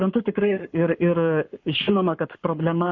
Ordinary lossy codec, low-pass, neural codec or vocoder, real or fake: MP3, 48 kbps; 7.2 kHz; codec, 44.1 kHz, 7.8 kbps, Pupu-Codec; fake